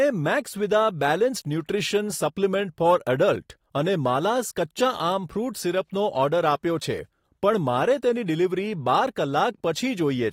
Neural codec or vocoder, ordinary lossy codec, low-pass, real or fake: none; AAC, 48 kbps; 19.8 kHz; real